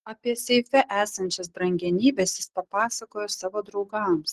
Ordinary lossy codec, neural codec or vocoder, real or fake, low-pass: Opus, 16 kbps; none; real; 14.4 kHz